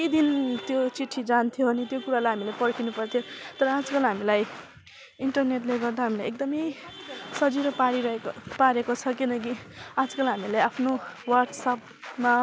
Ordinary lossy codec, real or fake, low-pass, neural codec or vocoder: none; real; none; none